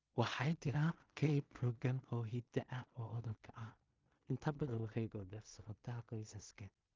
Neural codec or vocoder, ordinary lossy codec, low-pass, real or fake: codec, 16 kHz in and 24 kHz out, 0.4 kbps, LongCat-Audio-Codec, two codebook decoder; Opus, 32 kbps; 7.2 kHz; fake